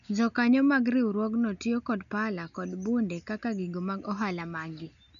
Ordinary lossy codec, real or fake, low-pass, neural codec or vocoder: none; real; 7.2 kHz; none